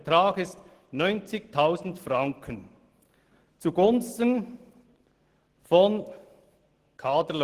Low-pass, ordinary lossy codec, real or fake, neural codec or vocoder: 14.4 kHz; Opus, 16 kbps; real; none